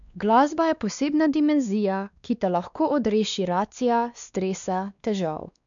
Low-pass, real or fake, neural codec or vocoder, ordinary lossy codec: 7.2 kHz; fake; codec, 16 kHz, 2 kbps, X-Codec, WavLM features, trained on Multilingual LibriSpeech; none